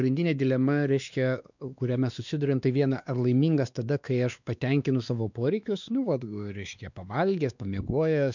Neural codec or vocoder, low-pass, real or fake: codec, 16 kHz, 2 kbps, X-Codec, WavLM features, trained on Multilingual LibriSpeech; 7.2 kHz; fake